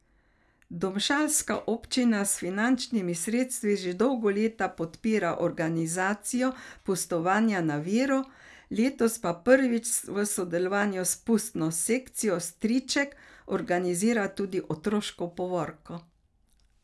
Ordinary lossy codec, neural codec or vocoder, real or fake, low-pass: none; none; real; none